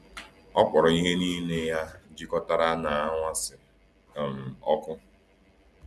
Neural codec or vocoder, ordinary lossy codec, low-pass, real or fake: none; none; none; real